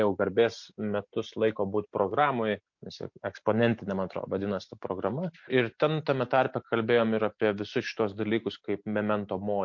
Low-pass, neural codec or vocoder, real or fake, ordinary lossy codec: 7.2 kHz; none; real; MP3, 48 kbps